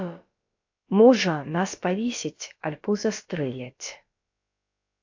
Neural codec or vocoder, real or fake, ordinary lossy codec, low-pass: codec, 16 kHz, about 1 kbps, DyCAST, with the encoder's durations; fake; AAC, 48 kbps; 7.2 kHz